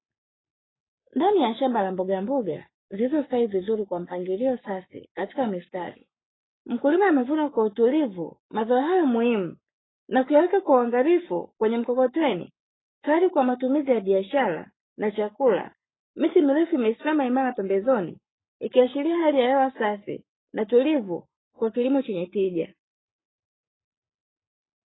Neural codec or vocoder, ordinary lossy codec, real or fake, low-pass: codec, 44.1 kHz, 7.8 kbps, Pupu-Codec; AAC, 16 kbps; fake; 7.2 kHz